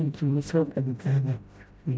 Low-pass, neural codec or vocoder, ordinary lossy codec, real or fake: none; codec, 16 kHz, 0.5 kbps, FreqCodec, smaller model; none; fake